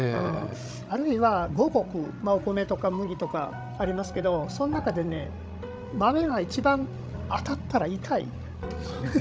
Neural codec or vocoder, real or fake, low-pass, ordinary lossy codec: codec, 16 kHz, 16 kbps, FreqCodec, larger model; fake; none; none